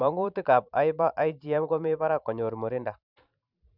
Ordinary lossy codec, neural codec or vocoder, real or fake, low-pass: none; vocoder, 44.1 kHz, 128 mel bands every 512 samples, BigVGAN v2; fake; 5.4 kHz